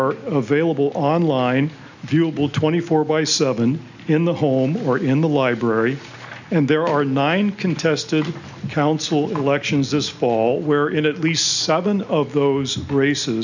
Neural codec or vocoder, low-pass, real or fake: none; 7.2 kHz; real